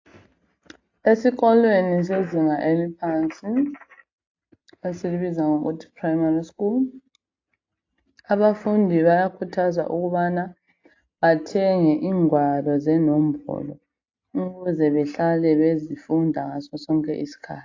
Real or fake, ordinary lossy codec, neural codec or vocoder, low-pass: real; AAC, 48 kbps; none; 7.2 kHz